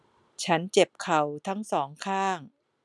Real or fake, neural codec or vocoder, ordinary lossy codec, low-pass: real; none; none; none